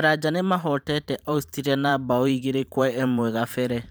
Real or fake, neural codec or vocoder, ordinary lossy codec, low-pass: fake; vocoder, 44.1 kHz, 128 mel bands every 512 samples, BigVGAN v2; none; none